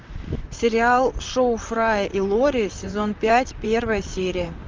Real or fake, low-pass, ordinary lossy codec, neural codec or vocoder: fake; 7.2 kHz; Opus, 24 kbps; vocoder, 44.1 kHz, 128 mel bands, Pupu-Vocoder